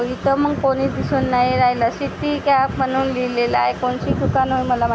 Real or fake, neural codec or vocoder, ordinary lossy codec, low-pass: real; none; none; none